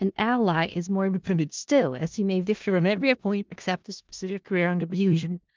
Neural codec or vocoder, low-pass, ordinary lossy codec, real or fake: codec, 16 kHz in and 24 kHz out, 0.4 kbps, LongCat-Audio-Codec, four codebook decoder; 7.2 kHz; Opus, 24 kbps; fake